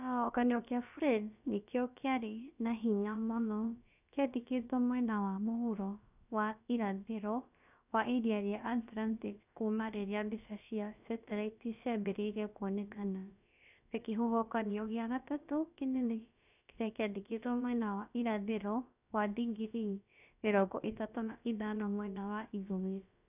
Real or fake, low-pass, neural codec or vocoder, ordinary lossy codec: fake; 3.6 kHz; codec, 16 kHz, about 1 kbps, DyCAST, with the encoder's durations; none